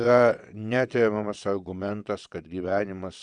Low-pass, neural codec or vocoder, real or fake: 9.9 kHz; vocoder, 22.05 kHz, 80 mel bands, WaveNeXt; fake